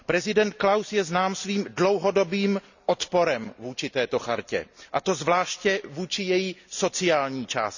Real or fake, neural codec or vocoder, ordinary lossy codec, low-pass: real; none; none; 7.2 kHz